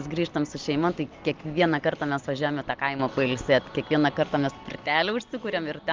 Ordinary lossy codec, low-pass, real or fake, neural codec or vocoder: Opus, 24 kbps; 7.2 kHz; real; none